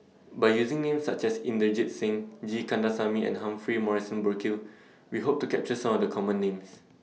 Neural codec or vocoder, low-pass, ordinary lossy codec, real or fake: none; none; none; real